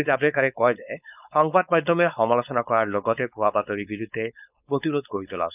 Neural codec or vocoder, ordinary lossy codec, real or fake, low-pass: codec, 16 kHz, 4.8 kbps, FACodec; none; fake; 3.6 kHz